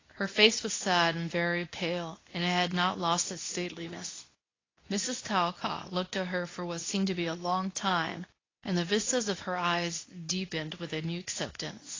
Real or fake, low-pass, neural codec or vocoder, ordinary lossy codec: fake; 7.2 kHz; codec, 24 kHz, 0.9 kbps, WavTokenizer, medium speech release version 2; AAC, 32 kbps